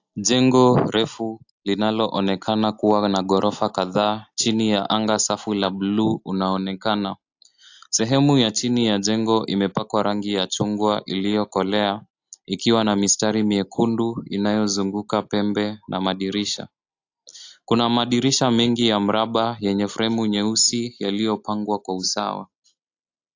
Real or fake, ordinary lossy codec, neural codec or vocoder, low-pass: real; AAC, 48 kbps; none; 7.2 kHz